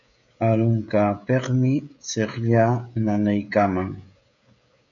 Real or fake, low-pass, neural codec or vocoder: fake; 7.2 kHz; codec, 16 kHz, 16 kbps, FreqCodec, smaller model